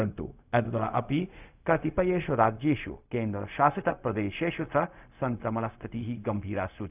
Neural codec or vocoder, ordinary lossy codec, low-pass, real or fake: codec, 16 kHz, 0.4 kbps, LongCat-Audio-Codec; none; 3.6 kHz; fake